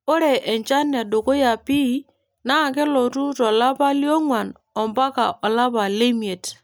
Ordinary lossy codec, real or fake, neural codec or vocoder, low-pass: none; real; none; none